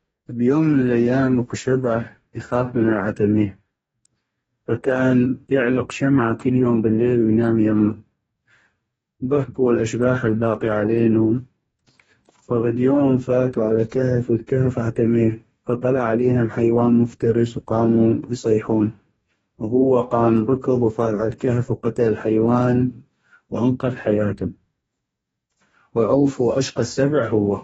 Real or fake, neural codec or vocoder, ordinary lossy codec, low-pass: fake; codec, 44.1 kHz, 2.6 kbps, DAC; AAC, 24 kbps; 19.8 kHz